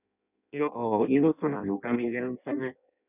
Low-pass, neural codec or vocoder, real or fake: 3.6 kHz; codec, 16 kHz in and 24 kHz out, 0.6 kbps, FireRedTTS-2 codec; fake